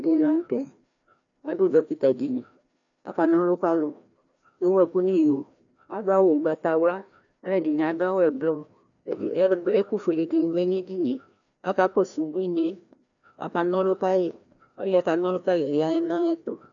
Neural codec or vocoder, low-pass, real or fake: codec, 16 kHz, 1 kbps, FreqCodec, larger model; 7.2 kHz; fake